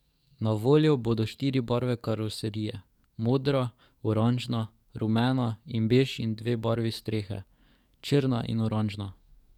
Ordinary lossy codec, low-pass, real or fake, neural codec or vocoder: none; 19.8 kHz; fake; codec, 44.1 kHz, 7.8 kbps, DAC